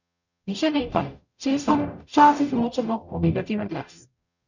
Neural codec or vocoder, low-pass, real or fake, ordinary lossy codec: codec, 44.1 kHz, 0.9 kbps, DAC; 7.2 kHz; fake; none